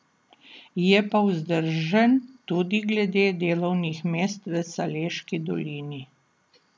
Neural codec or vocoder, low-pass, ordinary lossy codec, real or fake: none; none; none; real